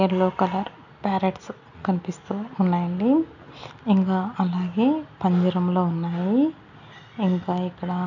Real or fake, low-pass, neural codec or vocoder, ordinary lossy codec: real; 7.2 kHz; none; none